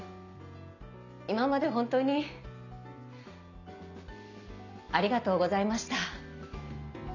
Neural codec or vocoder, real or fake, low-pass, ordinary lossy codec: none; real; 7.2 kHz; none